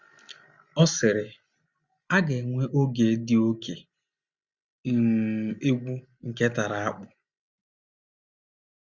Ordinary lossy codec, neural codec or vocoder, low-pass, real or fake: Opus, 64 kbps; none; 7.2 kHz; real